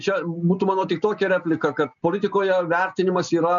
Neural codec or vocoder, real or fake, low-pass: none; real; 7.2 kHz